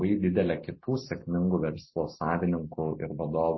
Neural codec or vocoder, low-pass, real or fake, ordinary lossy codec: none; 7.2 kHz; real; MP3, 24 kbps